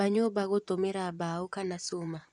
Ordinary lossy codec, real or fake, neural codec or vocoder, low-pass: none; fake; vocoder, 24 kHz, 100 mel bands, Vocos; 10.8 kHz